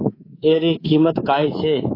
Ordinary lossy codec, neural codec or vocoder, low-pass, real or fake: AAC, 24 kbps; codec, 24 kHz, 3.1 kbps, DualCodec; 5.4 kHz; fake